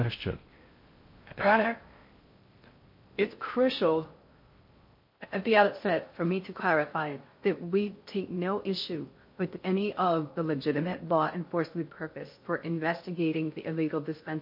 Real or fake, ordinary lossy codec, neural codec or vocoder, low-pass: fake; MP3, 32 kbps; codec, 16 kHz in and 24 kHz out, 0.6 kbps, FocalCodec, streaming, 4096 codes; 5.4 kHz